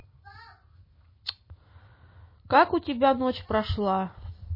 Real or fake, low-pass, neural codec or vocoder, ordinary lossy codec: real; 5.4 kHz; none; MP3, 24 kbps